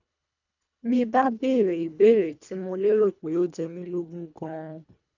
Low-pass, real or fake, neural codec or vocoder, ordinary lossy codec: 7.2 kHz; fake; codec, 24 kHz, 1.5 kbps, HILCodec; none